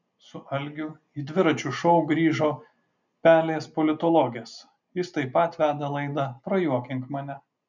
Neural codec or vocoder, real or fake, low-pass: none; real; 7.2 kHz